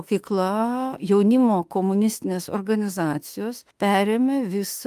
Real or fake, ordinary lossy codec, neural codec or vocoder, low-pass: fake; Opus, 32 kbps; autoencoder, 48 kHz, 32 numbers a frame, DAC-VAE, trained on Japanese speech; 14.4 kHz